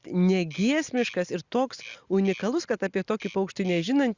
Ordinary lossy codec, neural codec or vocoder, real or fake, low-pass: Opus, 64 kbps; none; real; 7.2 kHz